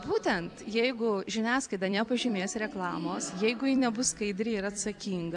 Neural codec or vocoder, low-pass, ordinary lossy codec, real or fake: vocoder, 44.1 kHz, 128 mel bands every 256 samples, BigVGAN v2; 10.8 kHz; MP3, 96 kbps; fake